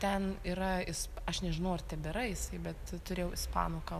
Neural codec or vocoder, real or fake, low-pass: none; real; 14.4 kHz